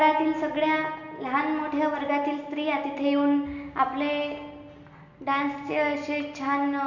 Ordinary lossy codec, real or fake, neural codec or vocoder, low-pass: none; real; none; 7.2 kHz